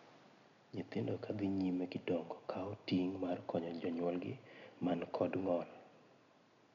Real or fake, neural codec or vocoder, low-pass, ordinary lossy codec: real; none; 7.2 kHz; none